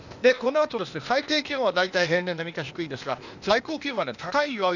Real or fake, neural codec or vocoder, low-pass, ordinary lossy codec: fake; codec, 16 kHz, 0.8 kbps, ZipCodec; 7.2 kHz; none